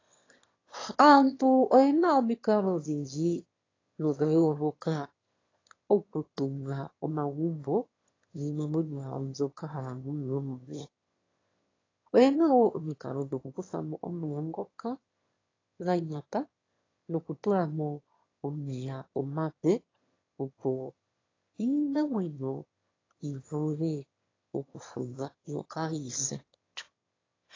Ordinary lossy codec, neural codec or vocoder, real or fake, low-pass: AAC, 32 kbps; autoencoder, 22.05 kHz, a latent of 192 numbers a frame, VITS, trained on one speaker; fake; 7.2 kHz